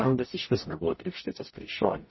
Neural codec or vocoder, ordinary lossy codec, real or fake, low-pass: codec, 44.1 kHz, 0.9 kbps, DAC; MP3, 24 kbps; fake; 7.2 kHz